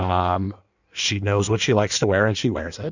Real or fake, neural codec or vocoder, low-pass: fake; codec, 16 kHz in and 24 kHz out, 1.1 kbps, FireRedTTS-2 codec; 7.2 kHz